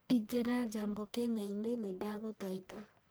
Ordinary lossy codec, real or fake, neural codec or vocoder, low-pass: none; fake; codec, 44.1 kHz, 1.7 kbps, Pupu-Codec; none